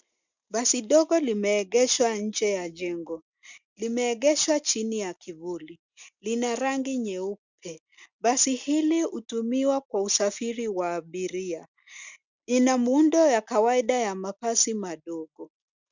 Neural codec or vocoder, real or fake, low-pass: none; real; 7.2 kHz